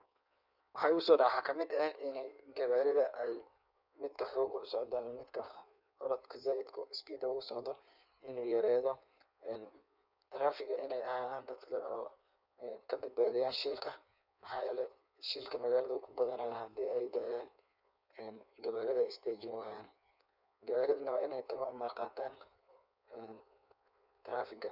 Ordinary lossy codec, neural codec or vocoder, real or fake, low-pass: none; codec, 16 kHz in and 24 kHz out, 1.1 kbps, FireRedTTS-2 codec; fake; 5.4 kHz